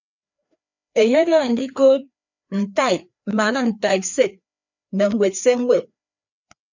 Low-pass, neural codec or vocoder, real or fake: 7.2 kHz; codec, 16 kHz, 2 kbps, FreqCodec, larger model; fake